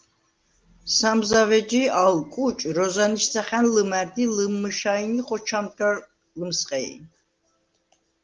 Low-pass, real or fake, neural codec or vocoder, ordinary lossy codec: 7.2 kHz; real; none; Opus, 24 kbps